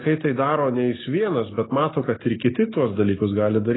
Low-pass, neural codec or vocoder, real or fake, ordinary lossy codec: 7.2 kHz; none; real; AAC, 16 kbps